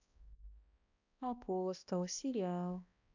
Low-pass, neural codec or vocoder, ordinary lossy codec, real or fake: 7.2 kHz; codec, 16 kHz, 1 kbps, X-Codec, HuBERT features, trained on balanced general audio; none; fake